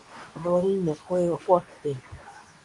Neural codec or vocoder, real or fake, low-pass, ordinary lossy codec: codec, 24 kHz, 0.9 kbps, WavTokenizer, medium speech release version 2; fake; 10.8 kHz; MP3, 64 kbps